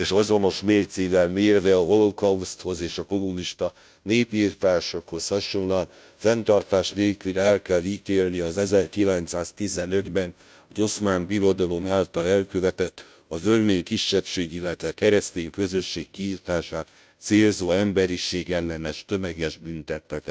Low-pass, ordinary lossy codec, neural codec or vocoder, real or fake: none; none; codec, 16 kHz, 0.5 kbps, FunCodec, trained on Chinese and English, 25 frames a second; fake